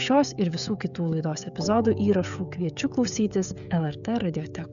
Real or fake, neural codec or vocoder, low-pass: fake; codec, 16 kHz, 16 kbps, FreqCodec, smaller model; 7.2 kHz